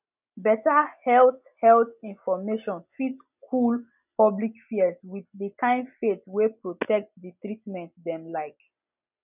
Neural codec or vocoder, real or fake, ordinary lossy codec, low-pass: none; real; none; 3.6 kHz